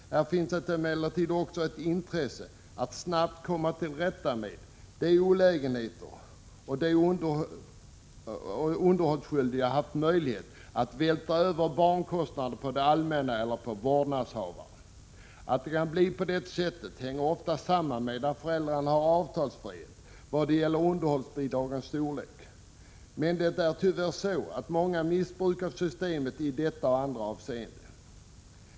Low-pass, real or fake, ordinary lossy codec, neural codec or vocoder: none; real; none; none